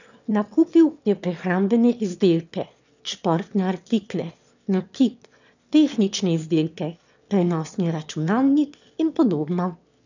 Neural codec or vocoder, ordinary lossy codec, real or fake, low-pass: autoencoder, 22.05 kHz, a latent of 192 numbers a frame, VITS, trained on one speaker; none; fake; 7.2 kHz